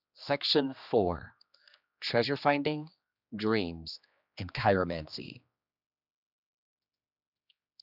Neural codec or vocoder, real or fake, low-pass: codec, 16 kHz, 2 kbps, X-Codec, HuBERT features, trained on general audio; fake; 5.4 kHz